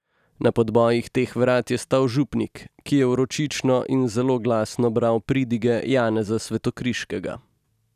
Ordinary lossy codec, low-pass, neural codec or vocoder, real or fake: none; 14.4 kHz; none; real